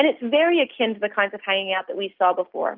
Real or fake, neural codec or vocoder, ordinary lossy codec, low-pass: real; none; Opus, 32 kbps; 5.4 kHz